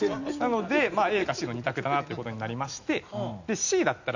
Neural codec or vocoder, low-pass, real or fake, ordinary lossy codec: none; 7.2 kHz; real; none